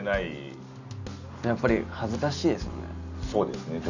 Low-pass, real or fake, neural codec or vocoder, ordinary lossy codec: 7.2 kHz; real; none; none